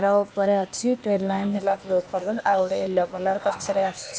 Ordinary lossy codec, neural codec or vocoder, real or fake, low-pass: none; codec, 16 kHz, 0.8 kbps, ZipCodec; fake; none